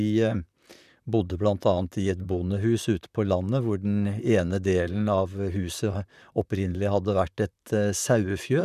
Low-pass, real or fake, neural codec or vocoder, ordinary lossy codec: 14.4 kHz; fake; vocoder, 44.1 kHz, 128 mel bands, Pupu-Vocoder; none